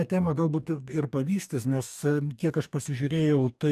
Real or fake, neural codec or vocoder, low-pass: fake; codec, 44.1 kHz, 2.6 kbps, DAC; 14.4 kHz